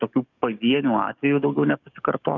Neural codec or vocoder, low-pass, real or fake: codec, 44.1 kHz, 7.8 kbps, DAC; 7.2 kHz; fake